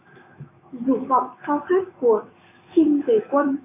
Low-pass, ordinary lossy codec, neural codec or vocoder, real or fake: 3.6 kHz; MP3, 32 kbps; autoencoder, 48 kHz, 128 numbers a frame, DAC-VAE, trained on Japanese speech; fake